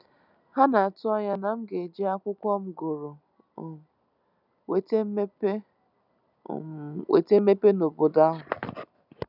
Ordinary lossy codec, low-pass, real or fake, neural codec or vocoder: none; 5.4 kHz; real; none